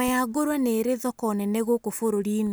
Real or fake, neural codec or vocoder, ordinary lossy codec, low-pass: real; none; none; none